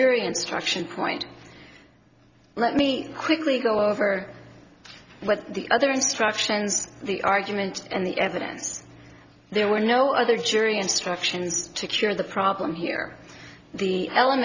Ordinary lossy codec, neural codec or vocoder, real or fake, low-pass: Opus, 64 kbps; none; real; 7.2 kHz